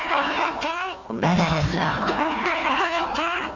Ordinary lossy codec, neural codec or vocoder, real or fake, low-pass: none; codec, 16 kHz, 1 kbps, FunCodec, trained on Chinese and English, 50 frames a second; fake; 7.2 kHz